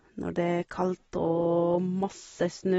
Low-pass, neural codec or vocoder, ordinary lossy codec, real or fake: 19.8 kHz; none; AAC, 24 kbps; real